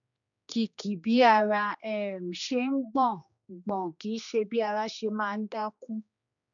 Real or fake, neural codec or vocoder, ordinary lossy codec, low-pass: fake; codec, 16 kHz, 2 kbps, X-Codec, HuBERT features, trained on general audio; none; 7.2 kHz